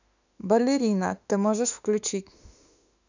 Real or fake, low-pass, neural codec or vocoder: fake; 7.2 kHz; autoencoder, 48 kHz, 32 numbers a frame, DAC-VAE, trained on Japanese speech